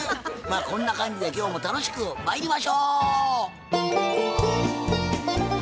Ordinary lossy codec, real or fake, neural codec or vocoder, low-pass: none; real; none; none